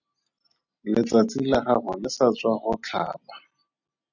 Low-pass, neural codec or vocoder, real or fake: 7.2 kHz; none; real